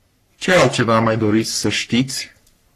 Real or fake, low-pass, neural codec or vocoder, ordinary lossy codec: fake; 14.4 kHz; codec, 44.1 kHz, 3.4 kbps, Pupu-Codec; AAC, 48 kbps